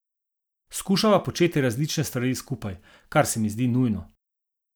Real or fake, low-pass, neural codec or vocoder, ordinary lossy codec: real; none; none; none